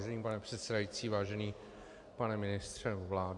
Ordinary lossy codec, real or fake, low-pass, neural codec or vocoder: Opus, 64 kbps; real; 10.8 kHz; none